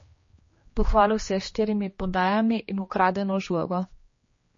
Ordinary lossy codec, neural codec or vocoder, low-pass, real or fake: MP3, 32 kbps; codec, 16 kHz, 2 kbps, X-Codec, HuBERT features, trained on general audio; 7.2 kHz; fake